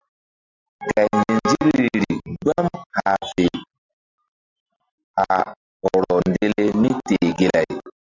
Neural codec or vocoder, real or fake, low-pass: none; real; 7.2 kHz